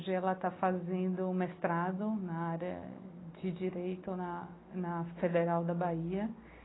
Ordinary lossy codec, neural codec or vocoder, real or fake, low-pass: AAC, 16 kbps; none; real; 7.2 kHz